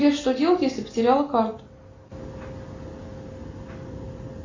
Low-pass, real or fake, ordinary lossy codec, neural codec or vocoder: 7.2 kHz; real; AAC, 32 kbps; none